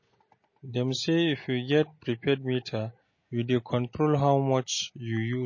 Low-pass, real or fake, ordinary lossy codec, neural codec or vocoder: 7.2 kHz; real; MP3, 32 kbps; none